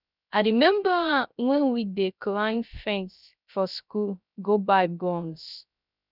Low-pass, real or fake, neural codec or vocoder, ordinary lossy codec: 5.4 kHz; fake; codec, 16 kHz, 0.3 kbps, FocalCodec; none